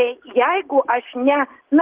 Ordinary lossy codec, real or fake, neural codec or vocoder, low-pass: Opus, 16 kbps; real; none; 3.6 kHz